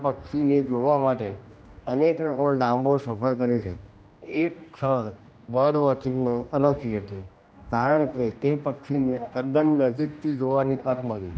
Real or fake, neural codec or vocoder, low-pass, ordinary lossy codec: fake; codec, 16 kHz, 1 kbps, X-Codec, HuBERT features, trained on general audio; none; none